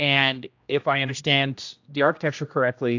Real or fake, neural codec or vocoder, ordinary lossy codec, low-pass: fake; codec, 16 kHz, 1 kbps, X-Codec, HuBERT features, trained on general audio; AAC, 48 kbps; 7.2 kHz